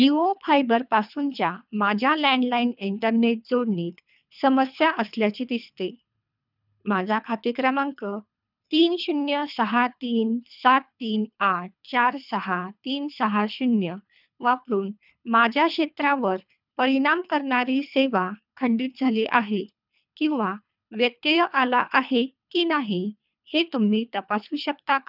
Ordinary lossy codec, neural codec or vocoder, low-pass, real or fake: none; codec, 24 kHz, 3 kbps, HILCodec; 5.4 kHz; fake